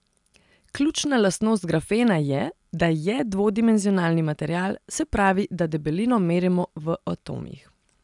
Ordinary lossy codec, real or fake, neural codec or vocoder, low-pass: none; real; none; 10.8 kHz